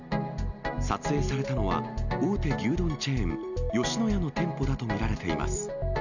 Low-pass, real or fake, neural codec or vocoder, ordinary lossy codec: 7.2 kHz; real; none; none